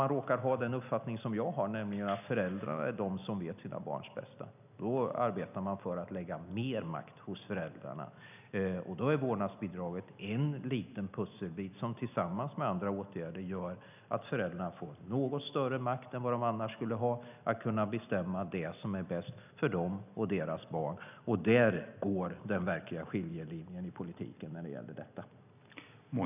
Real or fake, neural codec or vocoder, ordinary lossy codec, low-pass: real; none; none; 3.6 kHz